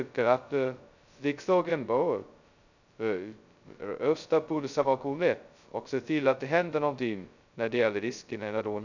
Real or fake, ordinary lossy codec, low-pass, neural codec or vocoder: fake; none; 7.2 kHz; codec, 16 kHz, 0.2 kbps, FocalCodec